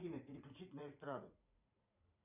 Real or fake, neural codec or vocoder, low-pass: fake; vocoder, 44.1 kHz, 80 mel bands, Vocos; 3.6 kHz